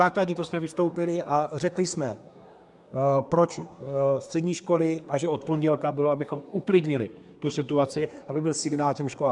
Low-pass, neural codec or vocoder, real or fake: 10.8 kHz; codec, 24 kHz, 1 kbps, SNAC; fake